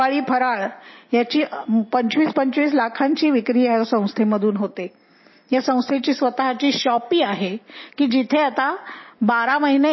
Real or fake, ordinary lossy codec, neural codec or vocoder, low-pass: real; MP3, 24 kbps; none; 7.2 kHz